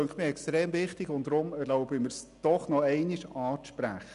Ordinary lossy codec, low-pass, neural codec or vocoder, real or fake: none; 10.8 kHz; none; real